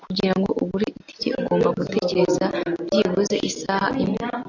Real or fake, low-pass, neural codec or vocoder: real; 7.2 kHz; none